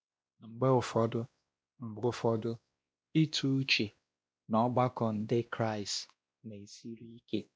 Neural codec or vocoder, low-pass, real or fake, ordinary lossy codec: codec, 16 kHz, 1 kbps, X-Codec, WavLM features, trained on Multilingual LibriSpeech; none; fake; none